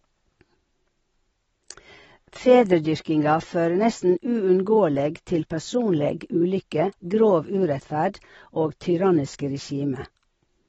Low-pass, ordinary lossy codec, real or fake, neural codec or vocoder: 9.9 kHz; AAC, 24 kbps; real; none